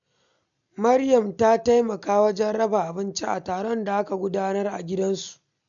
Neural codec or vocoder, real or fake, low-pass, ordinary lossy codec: none; real; 7.2 kHz; none